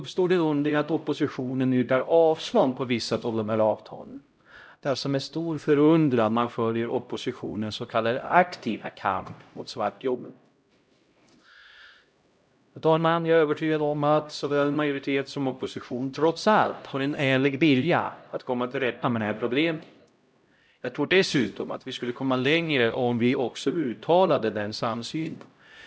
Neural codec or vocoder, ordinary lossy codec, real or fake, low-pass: codec, 16 kHz, 0.5 kbps, X-Codec, HuBERT features, trained on LibriSpeech; none; fake; none